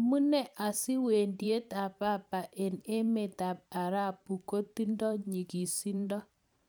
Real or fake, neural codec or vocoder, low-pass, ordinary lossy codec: fake; vocoder, 44.1 kHz, 128 mel bands every 512 samples, BigVGAN v2; none; none